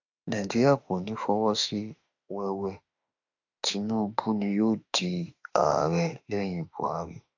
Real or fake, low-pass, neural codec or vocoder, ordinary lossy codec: fake; 7.2 kHz; autoencoder, 48 kHz, 32 numbers a frame, DAC-VAE, trained on Japanese speech; AAC, 48 kbps